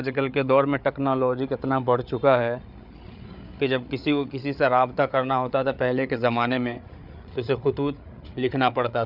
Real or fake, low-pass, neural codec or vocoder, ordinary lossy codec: fake; 5.4 kHz; codec, 16 kHz, 8 kbps, FreqCodec, larger model; none